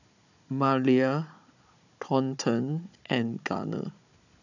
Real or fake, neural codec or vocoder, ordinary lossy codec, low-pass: fake; codec, 16 kHz, 16 kbps, FunCodec, trained on Chinese and English, 50 frames a second; none; 7.2 kHz